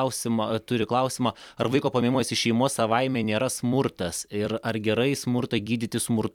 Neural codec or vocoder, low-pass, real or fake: vocoder, 44.1 kHz, 128 mel bands every 256 samples, BigVGAN v2; 19.8 kHz; fake